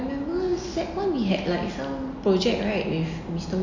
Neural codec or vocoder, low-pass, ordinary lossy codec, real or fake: none; 7.2 kHz; none; real